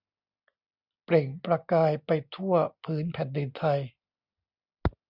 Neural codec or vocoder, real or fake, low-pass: none; real; 5.4 kHz